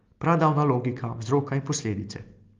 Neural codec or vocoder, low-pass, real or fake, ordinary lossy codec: none; 7.2 kHz; real; Opus, 32 kbps